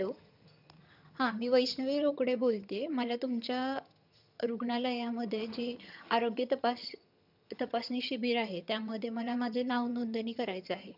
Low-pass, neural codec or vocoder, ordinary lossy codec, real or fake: 5.4 kHz; vocoder, 22.05 kHz, 80 mel bands, HiFi-GAN; none; fake